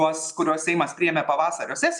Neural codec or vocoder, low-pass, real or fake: none; 10.8 kHz; real